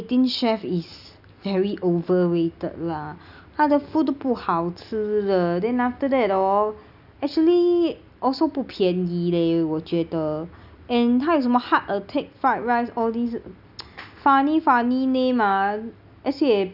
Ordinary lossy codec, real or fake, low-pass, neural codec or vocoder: none; real; 5.4 kHz; none